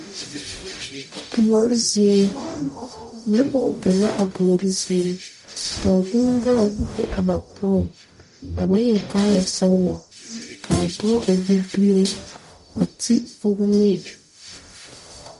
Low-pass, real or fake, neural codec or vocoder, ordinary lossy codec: 14.4 kHz; fake; codec, 44.1 kHz, 0.9 kbps, DAC; MP3, 48 kbps